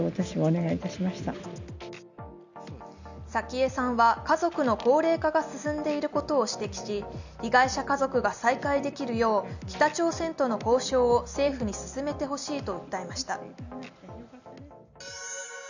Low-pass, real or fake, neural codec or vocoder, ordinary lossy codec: 7.2 kHz; real; none; none